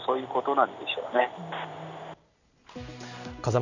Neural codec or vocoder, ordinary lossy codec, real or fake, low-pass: none; none; real; 7.2 kHz